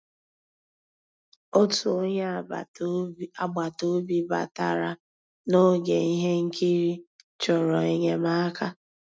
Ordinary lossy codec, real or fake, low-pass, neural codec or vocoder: none; real; none; none